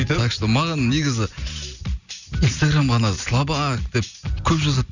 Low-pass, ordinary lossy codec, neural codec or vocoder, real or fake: 7.2 kHz; none; none; real